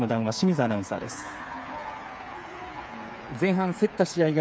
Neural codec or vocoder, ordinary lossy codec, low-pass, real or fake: codec, 16 kHz, 8 kbps, FreqCodec, smaller model; none; none; fake